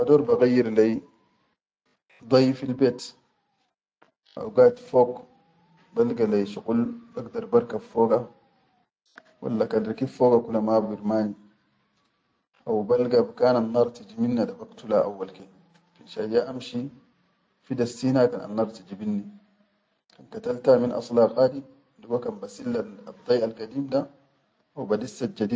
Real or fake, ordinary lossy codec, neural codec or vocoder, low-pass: real; none; none; none